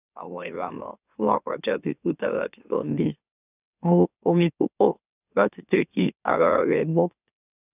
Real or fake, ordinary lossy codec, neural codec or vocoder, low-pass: fake; none; autoencoder, 44.1 kHz, a latent of 192 numbers a frame, MeloTTS; 3.6 kHz